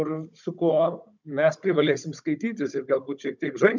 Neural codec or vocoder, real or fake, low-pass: codec, 16 kHz, 16 kbps, FunCodec, trained on Chinese and English, 50 frames a second; fake; 7.2 kHz